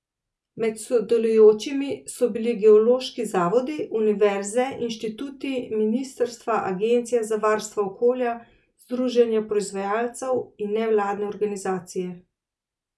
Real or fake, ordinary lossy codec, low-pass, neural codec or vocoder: real; none; none; none